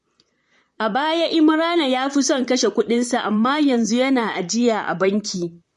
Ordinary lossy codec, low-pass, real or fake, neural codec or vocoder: MP3, 48 kbps; 14.4 kHz; fake; vocoder, 44.1 kHz, 128 mel bands, Pupu-Vocoder